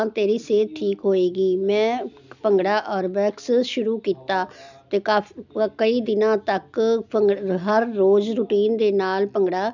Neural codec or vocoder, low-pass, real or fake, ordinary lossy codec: none; 7.2 kHz; real; none